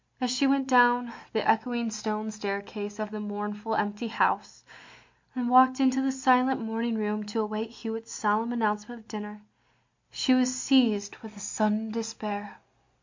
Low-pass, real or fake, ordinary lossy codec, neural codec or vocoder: 7.2 kHz; real; MP3, 48 kbps; none